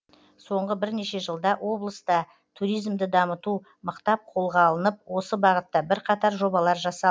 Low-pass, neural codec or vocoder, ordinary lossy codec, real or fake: none; none; none; real